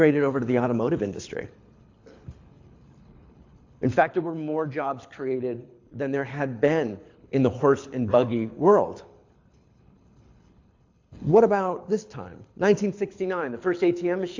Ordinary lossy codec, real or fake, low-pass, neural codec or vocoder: AAC, 48 kbps; fake; 7.2 kHz; codec, 24 kHz, 6 kbps, HILCodec